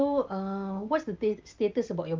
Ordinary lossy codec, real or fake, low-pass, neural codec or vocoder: Opus, 32 kbps; real; 7.2 kHz; none